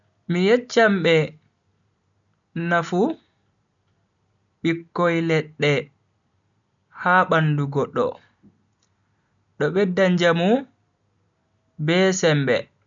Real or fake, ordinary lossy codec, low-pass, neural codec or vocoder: real; none; 7.2 kHz; none